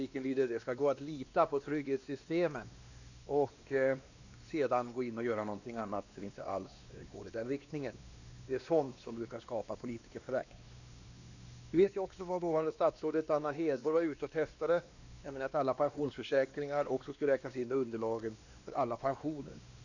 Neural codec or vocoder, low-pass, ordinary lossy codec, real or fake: codec, 16 kHz, 2 kbps, X-Codec, WavLM features, trained on Multilingual LibriSpeech; 7.2 kHz; none; fake